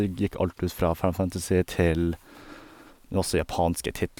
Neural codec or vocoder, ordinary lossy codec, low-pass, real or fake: none; none; 19.8 kHz; real